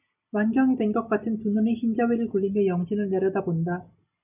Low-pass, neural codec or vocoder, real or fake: 3.6 kHz; none; real